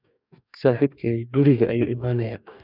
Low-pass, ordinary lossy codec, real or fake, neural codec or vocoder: 5.4 kHz; none; fake; codec, 44.1 kHz, 2.6 kbps, DAC